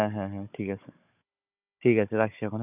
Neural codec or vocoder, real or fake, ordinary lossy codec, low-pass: codec, 16 kHz, 16 kbps, FunCodec, trained on Chinese and English, 50 frames a second; fake; none; 3.6 kHz